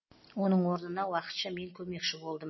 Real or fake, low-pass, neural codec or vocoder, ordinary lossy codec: real; 7.2 kHz; none; MP3, 24 kbps